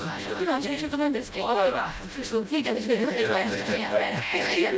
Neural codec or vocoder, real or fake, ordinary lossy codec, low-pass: codec, 16 kHz, 0.5 kbps, FreqCodec, smaller model; fake; none; none